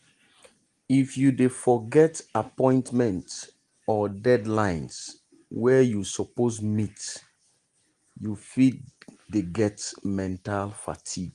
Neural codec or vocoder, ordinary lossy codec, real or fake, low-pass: none; Opus, 24 kbps; real; 9.9 kHz